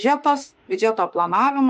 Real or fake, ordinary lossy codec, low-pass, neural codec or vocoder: fake; MP3, 48 kbps; 14.4 kHz; autoencoder, 48 kHz, 32 numbers a frame, DAC-VAE, trained on Japanese speech